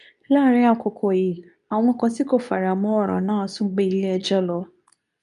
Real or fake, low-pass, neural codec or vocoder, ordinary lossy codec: fake; 10.8 kHz; codec, 24 kHz, 0.9 kbps, WavTokenizer, medium speech release version 2; none